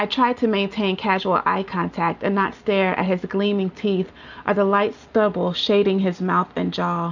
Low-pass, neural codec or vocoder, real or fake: 7.2 kHz; none; real